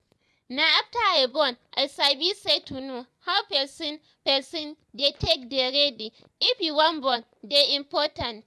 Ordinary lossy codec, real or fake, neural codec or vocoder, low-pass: none; fake; vocoder, 24 kHz, 100 mel bands, Vocos; none